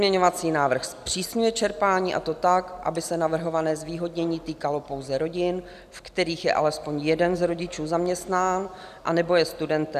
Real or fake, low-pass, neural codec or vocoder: real; 14.4 kHz; none